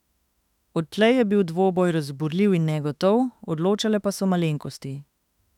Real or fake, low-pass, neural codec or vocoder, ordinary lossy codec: fake; 19.8 kHz; autoencoder, 48 kHz, 32 numbers a frame, DAC-VAE, trained on Japanese speech; none